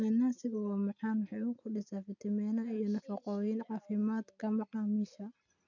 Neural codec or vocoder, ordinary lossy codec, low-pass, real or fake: none; none; 7.2 kHz; real